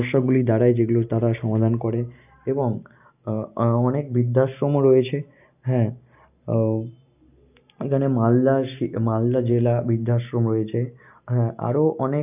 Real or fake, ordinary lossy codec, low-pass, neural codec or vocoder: real; none; 3.6 kHz; none